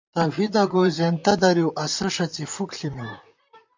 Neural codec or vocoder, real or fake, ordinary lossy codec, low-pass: vocoder, 44.1 kHz, 128 mel bands, Pupu-Vocoder; fake; MP3, 48 kbps; 7.2 kHz